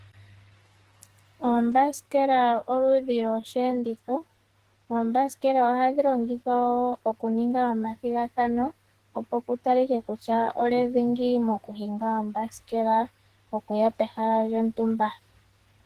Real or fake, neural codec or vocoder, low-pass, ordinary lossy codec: fake; codec, 44.1 kHz, 2.6 kbps, SNAC; 14.4 kHz; Opus, 16 kbps